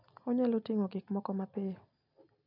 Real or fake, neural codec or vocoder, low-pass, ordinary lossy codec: real; none; 5.4 kHz; none